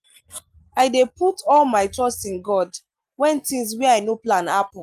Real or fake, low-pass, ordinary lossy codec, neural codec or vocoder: real; 14.4 kHz; Opus, 32 kbps; none